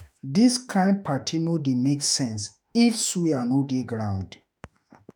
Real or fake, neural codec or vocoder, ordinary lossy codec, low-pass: fake; autoencoder, 48 kHz, 32 numbers a frame, DAC-VAE, trained on Japanese speech; none; none